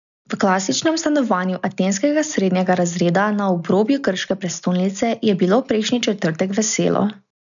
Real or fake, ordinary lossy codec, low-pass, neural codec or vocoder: real; none; 7.2 kHz; none